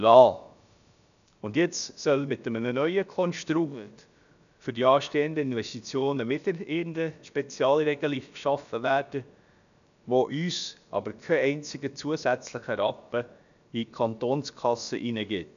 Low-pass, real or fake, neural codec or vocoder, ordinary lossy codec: 7.2 kHz; fake; codec, 16 kHz, about 1 kbps, DyCAST, with the encoder's durations; none